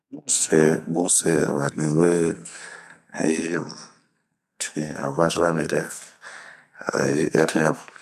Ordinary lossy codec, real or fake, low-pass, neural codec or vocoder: none; fake; 14.4 kHz; codec, 44.1 kHz, 2.6 kbps, SNAC